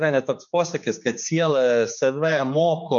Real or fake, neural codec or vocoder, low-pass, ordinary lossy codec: fake; codec, 16 kHz, 4 kbps, X-Codec, HuBERT features, trained on general audio; 7.2 kHz; MP3, 48 kbps